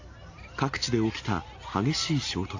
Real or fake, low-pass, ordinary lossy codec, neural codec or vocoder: real; 7.2 kHz; AAC, 48 kbps; none